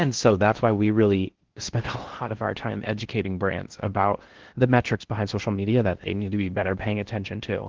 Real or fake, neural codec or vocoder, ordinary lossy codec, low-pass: fake; codec, 16 kHz in and 24 kHz out, 0.6 kbps, FocalCodec, streaming, 4096 codes; Opus, 16 kbps; 7.2 kHz